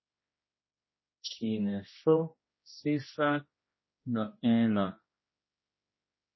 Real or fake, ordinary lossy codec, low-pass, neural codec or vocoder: fake; MP3, 24 kbps; 7.2 kHz; codec, 16 kHz, 1 kbps, X-Codec, HuBERT features, trained on general audio